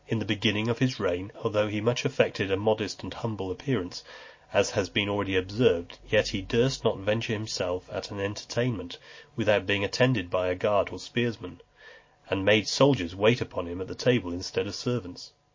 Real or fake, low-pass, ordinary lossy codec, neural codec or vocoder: real; 7.2 kHz; MP3, 32 kbps; none